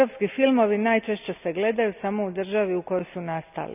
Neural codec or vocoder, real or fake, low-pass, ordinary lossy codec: none; real; 3.6 kHz; none